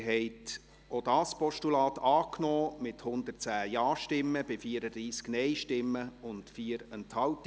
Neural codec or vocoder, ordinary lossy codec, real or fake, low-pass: none; none; real; none